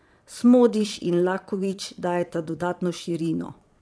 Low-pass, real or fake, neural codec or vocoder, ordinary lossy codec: none; fake; vocoder, 22.05 kHz, 80 mel bands, Vocos; none